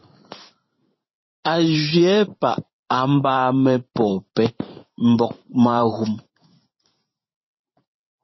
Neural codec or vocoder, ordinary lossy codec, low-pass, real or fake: vocoder, 44.1 kHz, 128 mel bands every 512 samples, BigVGAN v2; MP3, 24 kbps; 7.2 kHz; fake